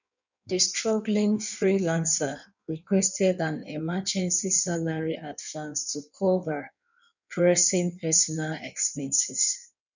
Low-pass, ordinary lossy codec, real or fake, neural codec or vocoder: 7.2 kHz; none; fake; codec, 16 kHz in and 24 kHz out, 1.1 kbps, FireRedTTS-2 codec